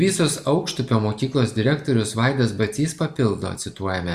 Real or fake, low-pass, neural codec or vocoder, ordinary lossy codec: real; 14.4 kHz; none; Opus, 64 kbps